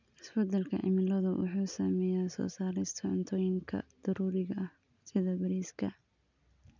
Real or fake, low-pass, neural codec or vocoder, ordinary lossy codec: real; 7.2 kHz; none; none